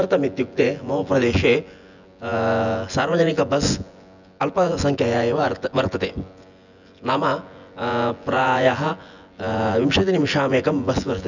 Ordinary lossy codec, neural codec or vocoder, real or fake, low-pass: none; vocoder, 24 kHz, 100 mel bands, Vocos; fake; 7.2 kHz